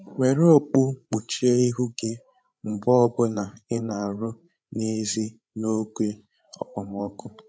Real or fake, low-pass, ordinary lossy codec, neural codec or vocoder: fake; none; none; codec, 16 kHz, 16 kbps, FreqCodec, larger model